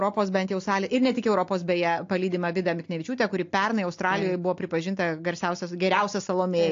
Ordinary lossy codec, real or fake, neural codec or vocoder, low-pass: AAC, 48 kbps; real; none; 7.2 kHz